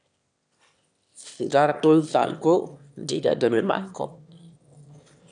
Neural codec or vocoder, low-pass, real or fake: autoencoder, 22.05 kHz, a latent of 192 numbers a frame, VITS, trained on one speaker; 9.9 kHz; fake